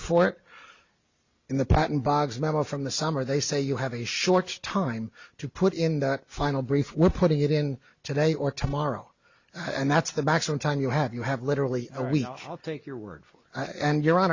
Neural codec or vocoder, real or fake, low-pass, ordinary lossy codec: none; real; 7.2 kHz; Opus, 64 kbps